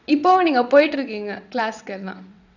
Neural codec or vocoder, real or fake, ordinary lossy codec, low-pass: vocoder, 22.05 kHz, 80 mel bands, WaveNeXt; fake; none; 7.2 kHz